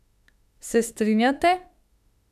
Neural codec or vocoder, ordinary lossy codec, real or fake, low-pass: autoencoder, 48 kHz, 32 numbers a frame, DAC-VAE, trained on Japanese speech; none; fake; 14.4 kHz